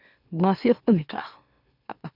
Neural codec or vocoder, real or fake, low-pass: autoencoder, 44.1 kHz, a latent of 192 numbers a frame, MeloTTS; fake; 5.4 kHz